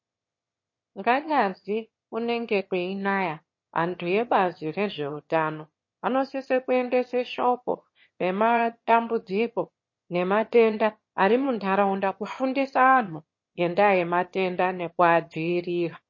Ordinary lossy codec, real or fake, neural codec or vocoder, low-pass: MP3, 32 kbps; fake; autoencoder, 22.05 kHz, a latent of 192 numbers a frame, VITS, trained on one speaker; 7.2 kHz